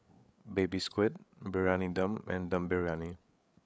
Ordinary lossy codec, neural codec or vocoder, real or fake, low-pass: none; codec, 16 kHz, 8 kbps, FreqCodec, larger model; fake; none